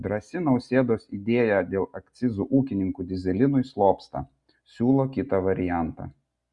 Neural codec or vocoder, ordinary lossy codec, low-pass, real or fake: none; Opus, 64 kbps; 10.8 kHz; real